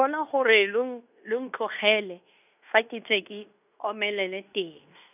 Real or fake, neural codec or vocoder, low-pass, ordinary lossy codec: fake; codec, 16 kHz in and 24 kHz out, 0.9 kbps, LongCat-Audio-Codec, fine tuned four codebook decoder; 3.6 kHz; none